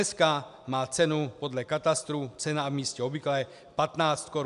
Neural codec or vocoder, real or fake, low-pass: none; real; 10.8 kHz